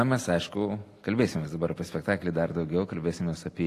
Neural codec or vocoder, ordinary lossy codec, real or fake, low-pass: none; AAC, 48 kbps; real; 14.4 kHz